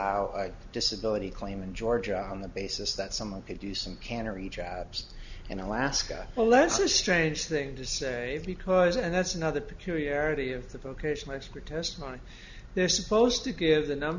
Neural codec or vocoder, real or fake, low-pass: none; real; 7.2 kHz